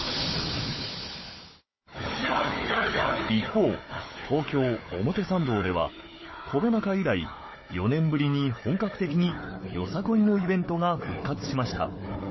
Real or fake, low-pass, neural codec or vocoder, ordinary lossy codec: fake; 7.2 kHz; codec, 16 kHz, 4 kbps, FunCodec, trained on Chinese and English, 50 frames a second; MP3, 24 kbps